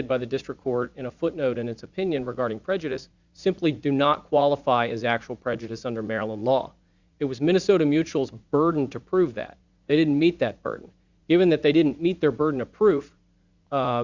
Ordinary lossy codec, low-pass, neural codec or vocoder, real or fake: Opus, 64 kbps; 7.2 kHz; vocoder, 44.1 kHz, 80 mel bands, Vocos; fake